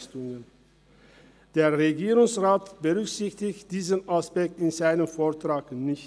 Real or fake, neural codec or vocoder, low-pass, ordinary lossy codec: real; none; none; none